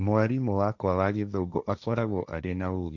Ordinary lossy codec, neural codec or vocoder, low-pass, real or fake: none; codec, 16 kHz, 1.1 kbps, Voila-Tokenizer; 7.2 kHz; fake